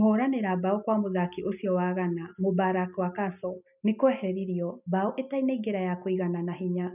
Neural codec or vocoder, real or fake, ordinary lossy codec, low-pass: none; real; none; 3.6 kHz